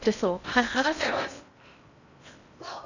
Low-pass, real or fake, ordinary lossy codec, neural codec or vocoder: 7.2 kHz; fake; none; codec, 16 kHz in and 24 kHz out, 0.8 kbps, FocalCodec, streaming, 65536 codes